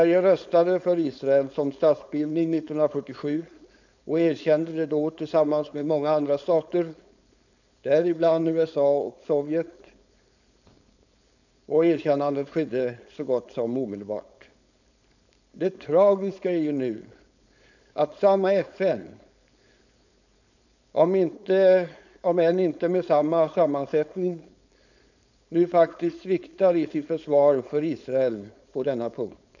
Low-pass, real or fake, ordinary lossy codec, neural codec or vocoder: 7.2 kHz; fake; none; codec, 16 kHz, 4.8 kbps, FACodec